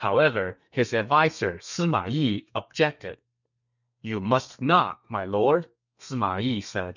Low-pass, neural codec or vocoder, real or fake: 7.2 kHz; codec, 32 kHz, 1.9 kbps, SNAC; fake